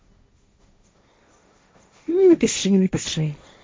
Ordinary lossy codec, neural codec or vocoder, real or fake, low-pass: none; codec, 16 kHz, 1.1 kbps, Voila-Tokenizer; fake; none